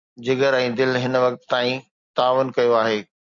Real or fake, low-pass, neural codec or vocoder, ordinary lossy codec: real; 7.2 kHz; none; MP3, 64 kbps